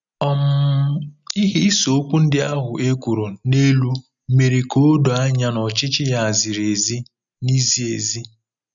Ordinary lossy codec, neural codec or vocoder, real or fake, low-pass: none; none; real; 7.2 kHz